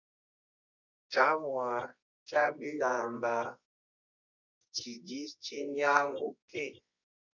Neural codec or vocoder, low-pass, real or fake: codec, 24 kHz, 0.9 kbps, WavTokenizer, medium music audio release; 7.2 kHz; fake